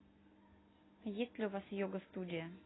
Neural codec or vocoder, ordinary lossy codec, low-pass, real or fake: none; AAC, 16 kbps; 7.2 kHz; real